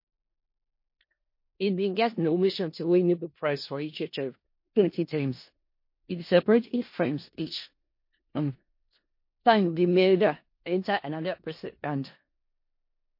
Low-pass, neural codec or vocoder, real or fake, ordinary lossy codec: 5.4 kHz; codec, 16 kHz in and 24 kHz out, 0.4 kbps, LongCat-Audio-Codec, four codebook decoder; fake; MP3, 32 kbps